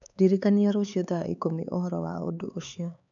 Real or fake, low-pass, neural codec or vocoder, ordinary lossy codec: fake; 7.2 kHz; codec, 16 kHz, 4 kbps, X-Codec, HuBERT features, trained on LibriSpeech; none